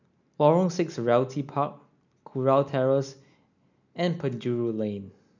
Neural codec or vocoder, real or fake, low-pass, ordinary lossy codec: none; real; 7.2 kHz; none